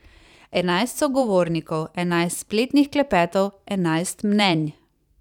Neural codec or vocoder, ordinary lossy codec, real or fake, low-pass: vocoder, 44.1 kHz, 128 mel bands every 512 samples, BigVGAN v2; none; fake; 19.8 kHz